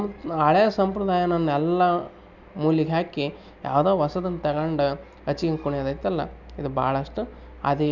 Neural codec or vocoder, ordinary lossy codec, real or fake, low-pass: none; none; real; 7.2 kHz